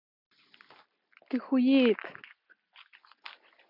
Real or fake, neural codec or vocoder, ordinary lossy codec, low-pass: real; none; MP3, 48 kbps; 5.4 kHz